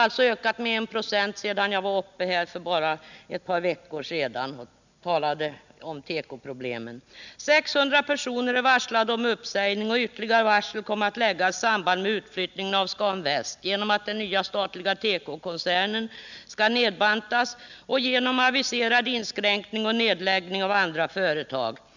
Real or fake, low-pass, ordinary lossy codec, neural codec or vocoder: real; 7.2 kHz; none; none